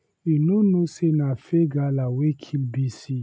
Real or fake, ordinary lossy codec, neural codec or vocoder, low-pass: real; none; none; none